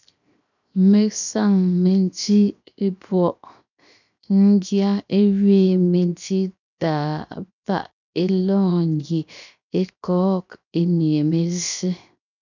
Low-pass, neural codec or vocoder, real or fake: 7.2 kHz; codec, 16 kHz, 0.7 kbps, FocalCodec; fake